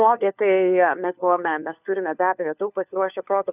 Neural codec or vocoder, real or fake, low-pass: codec, 16 kHz, 4 kbps, FunCodec, trained on LibriTTS, 50 frames a second; fake; 3.6 kHz